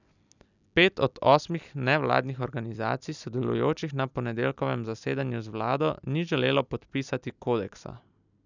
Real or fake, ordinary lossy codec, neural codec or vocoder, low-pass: real; none; none; 7.2 kHz